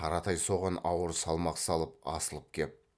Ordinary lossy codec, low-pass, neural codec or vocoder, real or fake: none; none; none; real